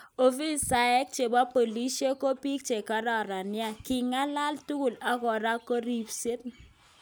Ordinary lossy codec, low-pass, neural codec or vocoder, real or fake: none; none; none; real